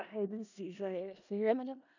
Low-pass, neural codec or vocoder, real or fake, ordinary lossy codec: 7.2 kHz; codec, 16 kHz in and 24 kHz out, 0.4 kbps, LongCat-Audio-Codec, four codebook decoder; fake; none